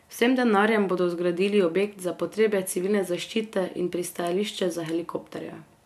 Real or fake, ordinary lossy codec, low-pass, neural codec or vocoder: real; MP3, 96 kbps; 14.4 kHz; none